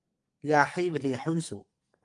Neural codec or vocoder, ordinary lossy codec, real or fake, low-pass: codec, 44.1 kHz, 2.6 kbps, SNAC; Opus, 32 kbps; fake; 10.8 kHz